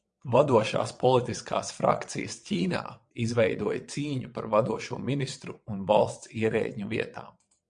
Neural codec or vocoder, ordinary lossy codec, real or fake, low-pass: vocoder, 22.05 kHz, 80 mel bands, WaveNeXt; MP3, 64 kbps; fake; 9.9 kHz